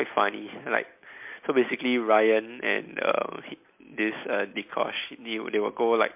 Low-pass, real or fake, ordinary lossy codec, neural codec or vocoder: 3.6 kHz; real; MP3, 32 kbps; none